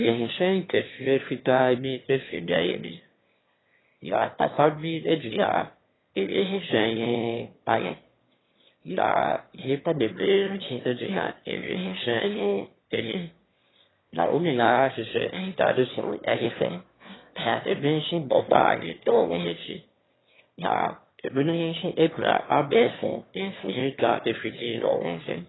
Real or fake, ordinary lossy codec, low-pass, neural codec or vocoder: fake; AAC, 16 kbps; 7.2 kHz; autoencoder, 22.05 kHz, a latent of 192 numbers a frame, VITS, trained on one speaker